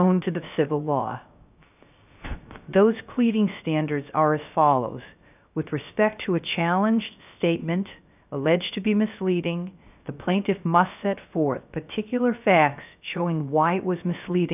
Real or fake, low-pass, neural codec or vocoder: fake; 3.6 kHz; codec, 16 kHz, 0.3 kbps, FocalCodec